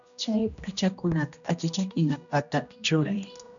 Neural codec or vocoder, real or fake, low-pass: codec, 16 kHz, 1 kbps, X-Codec, HuBERT features, trained on general audio; fake; 7.2 kHz